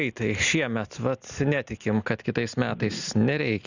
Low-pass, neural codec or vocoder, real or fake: 7.2 kHz; none; real